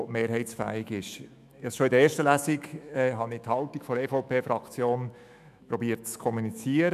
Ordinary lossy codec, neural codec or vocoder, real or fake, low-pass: none; autoencoder, 48 kHz, 128 numbers a frame, DAC-VAE, trained on Japanese speech; fake; 14.4 kHz